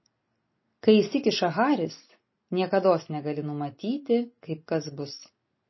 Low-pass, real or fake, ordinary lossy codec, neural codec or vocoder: 7.2 kHz; real; MP3, 24 kbps; none